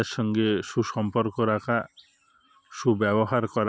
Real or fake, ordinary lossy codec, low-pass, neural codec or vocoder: real; none; none; none